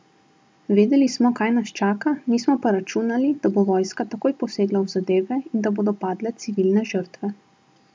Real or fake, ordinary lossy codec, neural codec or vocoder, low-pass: real; none; none; none